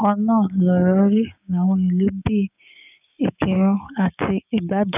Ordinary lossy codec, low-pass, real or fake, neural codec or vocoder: none; 3.6 kHz; fake; codec, 16 kHz, 6 kbps, DAC